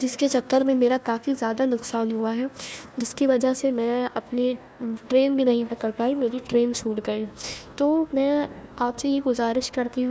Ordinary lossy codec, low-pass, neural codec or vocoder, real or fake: none; none; codec, 16 kHz, 1 kbps, FunCodec, trained on Chinese and English, 50 frames a second; fake